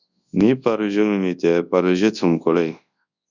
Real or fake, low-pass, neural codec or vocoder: fake; 7.2 kHz; codec, 24 kHz, 0.9 kbps, WavTokenizer, large speech release